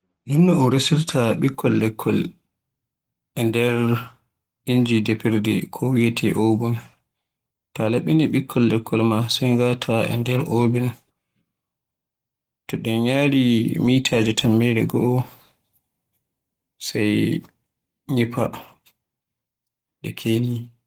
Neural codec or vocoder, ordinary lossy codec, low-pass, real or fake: codec, 44.1 kHz, 7.8 kbps, Pupu-Codec; Opus, 24 kbps; 19.8 kHz; fake